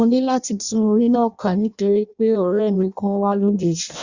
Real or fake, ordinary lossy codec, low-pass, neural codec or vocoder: fake; Opus, 64 kbps; 7.2 kHz; codec, 16 kHz in and 24 kHz out, 0.6 kbps, FireRedTTS-2 codec